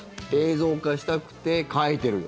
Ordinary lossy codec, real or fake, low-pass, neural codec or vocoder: none; real; none; none